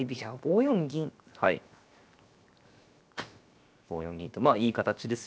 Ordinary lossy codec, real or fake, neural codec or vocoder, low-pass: none; fake; codec, 16 kHz, 0.7 kbps, FocalCodec; none